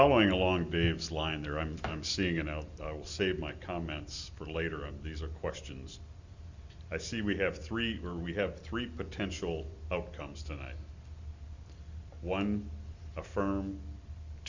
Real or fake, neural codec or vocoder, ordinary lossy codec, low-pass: real; none; Opus, 64 kbps; 7.2 kHz